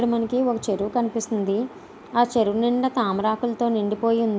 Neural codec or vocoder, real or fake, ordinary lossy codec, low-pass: none; real; none; none